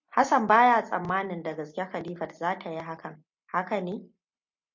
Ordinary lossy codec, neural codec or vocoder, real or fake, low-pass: MP3, 48 kbps; none; real; 7.2 kHz